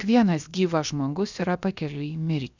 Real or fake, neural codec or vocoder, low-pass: fake; codec, 16 kHz, about 1 kbps, DyCAST, with the encoder's durations; 7.2 kHz